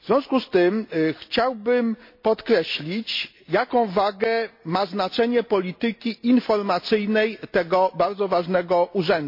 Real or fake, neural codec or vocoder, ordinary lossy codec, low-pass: real; none; MP3, 32 kbps; 5.4 kHz